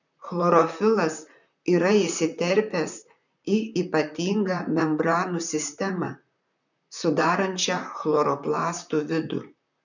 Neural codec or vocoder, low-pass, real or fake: vocoder, 44.1 kHz, 128 mel bands, Pupu-Vocoder; 7.2 kHz; fake